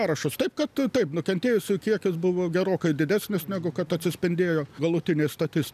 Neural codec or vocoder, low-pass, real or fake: none; 14.4 kHz; real